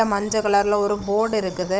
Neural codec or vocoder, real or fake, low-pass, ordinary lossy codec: codec, 16 kHz, 16 kbps, FunCodec, trained on Chinese and English, 50 frames a second; fake; none; none